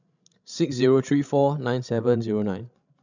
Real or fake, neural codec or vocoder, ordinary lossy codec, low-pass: fake; codec, 16 kHz, 16 kbps, FreqCodec, larger model; none; 7.2 kHz